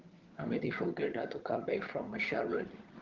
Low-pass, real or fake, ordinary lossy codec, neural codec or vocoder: 7.2 kHz; fake; Opus, 16 kbps; vocoder, 22.05 kHz, 80 mel bands, HiFi-GAN